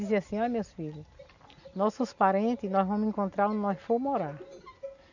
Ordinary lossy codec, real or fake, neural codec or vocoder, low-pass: none; real; none; 7.2 kHz